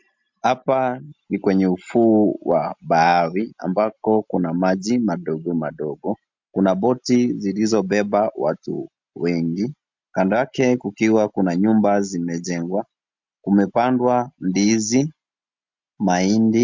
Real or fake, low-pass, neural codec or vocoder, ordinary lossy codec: real; 7.2 kHz; none; MP3, 64 kbps